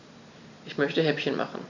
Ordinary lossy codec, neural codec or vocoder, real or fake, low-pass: none; vocoder, 44.1 kHz, 128 mel bands every 256 samples, BigVGAN v2; fake; 7.2 kHz